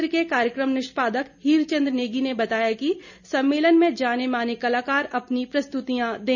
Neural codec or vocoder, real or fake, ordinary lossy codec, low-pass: none; real; none; 7.2 kHz